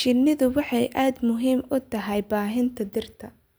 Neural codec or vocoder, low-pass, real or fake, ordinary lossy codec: none; none; real; none